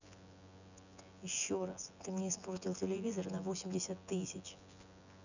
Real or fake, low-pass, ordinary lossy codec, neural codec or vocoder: fake; 7.2 kHz; none; vocoder, 24 kHz, 100 mel bands, Vocos